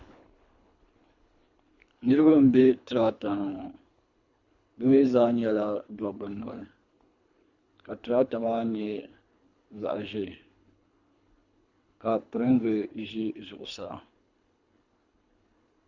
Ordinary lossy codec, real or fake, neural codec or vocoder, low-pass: Opus, 64 kbps; fake; codec, 24 kHz, 3 kbps, HILCodec; 7.2 kHz